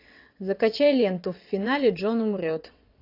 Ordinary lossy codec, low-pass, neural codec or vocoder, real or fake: AAC, 32 kbps; 5.4 kHz; vocoder, 22.05 kHz, 80 mel bands, WaveNeXt; fake